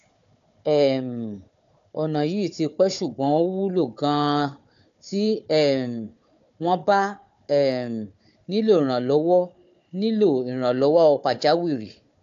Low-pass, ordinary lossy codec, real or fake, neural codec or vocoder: 7.2 kHz; AAC, 64 kbps; fake; codec, 16 kHz, 4 kbps, FunCodec, trained on Chinese and English, 50 frames a second